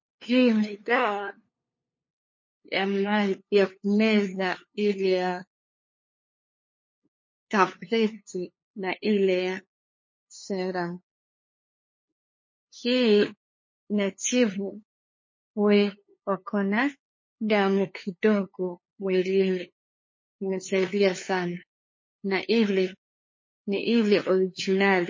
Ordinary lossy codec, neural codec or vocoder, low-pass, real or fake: MP3, 32 kbps; codec, 16 kHz, 2 kbps, FunCodec, trained on LibriTTS, 25 frames a second; 7.2 kHz; fake